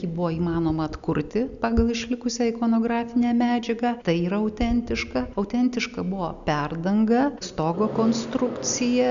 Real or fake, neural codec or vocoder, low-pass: real; none; 7.2 kHz